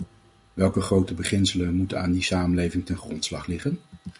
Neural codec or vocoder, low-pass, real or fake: none; 10.8 kHz; real